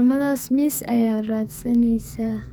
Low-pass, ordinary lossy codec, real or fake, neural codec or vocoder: none; none; fake; codec, 44.1 kHz, 2.6 kbps, SNAC